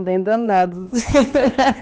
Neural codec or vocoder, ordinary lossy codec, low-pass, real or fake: codec, 16 kHz, 2 kbps, X-Codec, HuBERT features, trained on LibriSpeech; none; none; fake